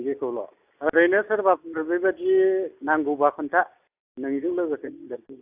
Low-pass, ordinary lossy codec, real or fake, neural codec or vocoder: 3.6 kHz; AAC, 32 kbps; real; none